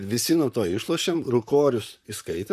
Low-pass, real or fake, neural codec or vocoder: 14.4 kHz; fake; vocoder, 44.1 kHz, 128 mel bands, Pupu-Vocoder